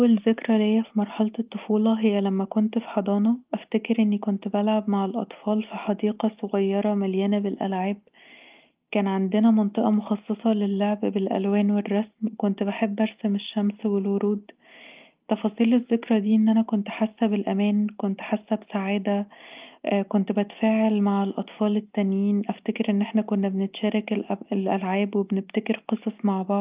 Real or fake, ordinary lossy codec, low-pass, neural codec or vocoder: real; Opus, 24 kbps; 3.6 kHz; none